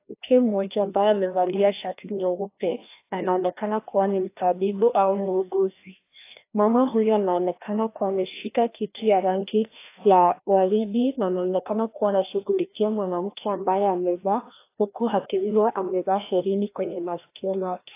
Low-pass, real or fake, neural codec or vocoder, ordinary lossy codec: 3.6 kHz; fake; codec, 16 kHz, 1 kbps, FreqCodec, larger model; AAC, 24 kbps